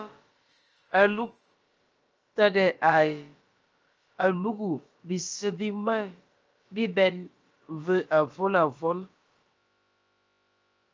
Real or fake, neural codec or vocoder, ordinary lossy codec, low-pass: fake; codec, 16 kHz, about 1 kbps, DyCAST, with the encoder's durations; Opus, 24 kbps; 7.2 kHz